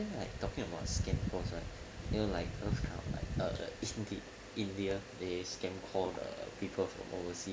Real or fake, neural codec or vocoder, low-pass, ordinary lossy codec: real; none; none; none